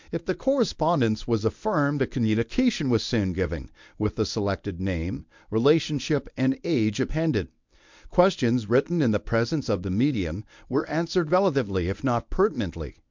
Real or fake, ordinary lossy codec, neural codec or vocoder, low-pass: fake; MP3, 64 kbps; codec, 24 kHz, 0.9 kbps, WavTokenizer, medium speech release version 1; 7.2 kHz